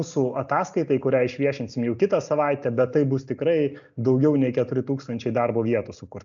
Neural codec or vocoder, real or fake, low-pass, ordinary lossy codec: none; real; 7.2 kHz; MP3, 96 kbps